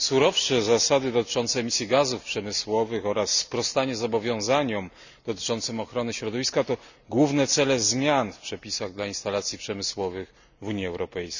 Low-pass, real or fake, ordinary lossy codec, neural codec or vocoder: 7.2 kHz; real; none; none